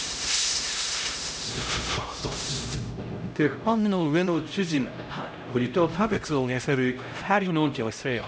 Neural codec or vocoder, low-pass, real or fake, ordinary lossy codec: codec, 16 kHz, 0.5 kbps, X-Codec, HuBERT features, trained on LibriSpeech; none; fake; none